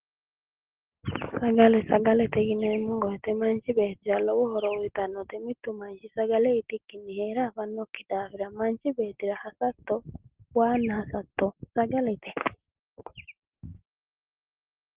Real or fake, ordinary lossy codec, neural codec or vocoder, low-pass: real; Opus, 16 kbps; none; 3.6 kHz